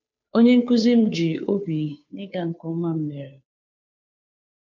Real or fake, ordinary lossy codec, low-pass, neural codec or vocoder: fake; MP3, 64 kbps; 7.2 kHz; codec, 16 kHz, 2 kbps, FunCodec, trained on Chinese and English, 25 frames a second